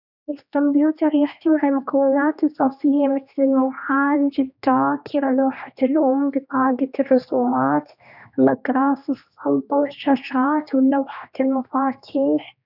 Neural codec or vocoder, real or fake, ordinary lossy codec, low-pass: codec, 16 kHz, 2 kbps, X-Codec, HuBERT features, trained on general audio; fake; none; 5.4 kHz